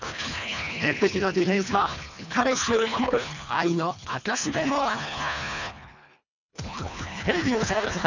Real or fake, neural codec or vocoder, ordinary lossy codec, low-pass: fake; codec, 24 kHz, 1.5 kbps, HILCodec; none; 7.2 kHz